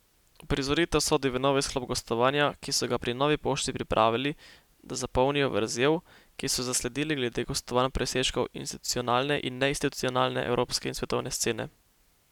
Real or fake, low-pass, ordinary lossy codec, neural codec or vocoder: real; 19.8 kHz; none; none